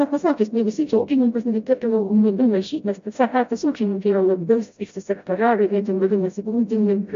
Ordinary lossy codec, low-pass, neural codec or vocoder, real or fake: MP3, 48 kbps; 7.2 kHz; codec, 16 kHz, 0.5 kbps, FreqCodec, smaller model; fake